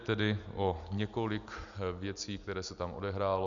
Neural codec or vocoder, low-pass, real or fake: none; 7.2 kHz; real